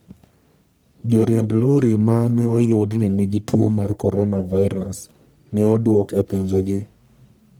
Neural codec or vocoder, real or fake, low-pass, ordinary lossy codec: codec, 44.1 kHz, 1.7 kbps, Pupu-Codec; fake; none; none